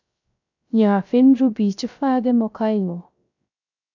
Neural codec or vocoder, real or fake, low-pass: codec, 16 kHz, 0.3 kbps, FocalCodec; fake; 7.2 kHz